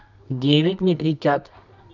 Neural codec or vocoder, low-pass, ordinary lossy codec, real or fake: codec, 24 kHz, 0.9 kbps, WavTokenizer, medium music audio release; 7.2 kHz; none; fake